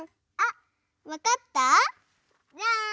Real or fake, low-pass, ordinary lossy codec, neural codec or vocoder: real; none; none; none